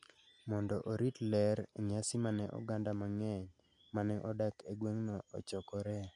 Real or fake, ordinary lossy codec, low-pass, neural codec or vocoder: real; AAC, 64 kbps; 10.8 kHz; none